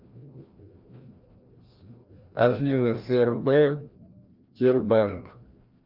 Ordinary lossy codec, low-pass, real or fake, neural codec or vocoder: Opus, 24 kbps; 5.4 kHz; fake; codec, 16 kHz, 1 kbps, FreqCodec, larger model